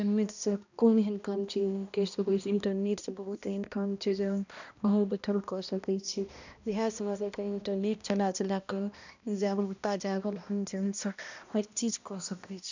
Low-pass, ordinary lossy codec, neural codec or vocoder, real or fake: 7.2 kHz; none; codec, 16 kHz, 1 kbps, X-Codec, HuBERT features, trained on balanced general audio; fake